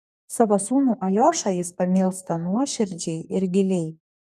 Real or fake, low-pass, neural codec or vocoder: fake; 14.4 kHz; codec, 44.1 kHz, 2.6 kbps, DAC